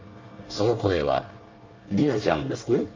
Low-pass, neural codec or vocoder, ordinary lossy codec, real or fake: 7.2 kHz; codec, 24 kHz, 1 kbps, SNAC; Opus, 32 kbps; fake